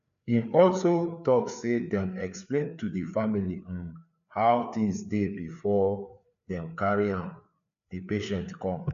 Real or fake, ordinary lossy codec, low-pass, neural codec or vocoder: fake; AAC, 96 kbps; 7.2 kHz; codec, 16 kHz, 4 kbps, FreqCodec, larger model